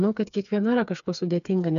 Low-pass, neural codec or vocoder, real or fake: 7.2 kHz; codec, 16 kHz, 4 kbps, FreqCodec, smaller model; fake